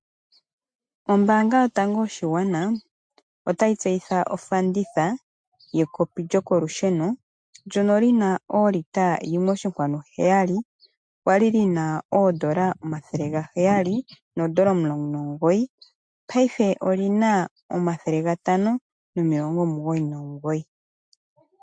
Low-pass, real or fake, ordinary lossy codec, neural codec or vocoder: 9.9 kHz; real; MP3, 64 kbps; none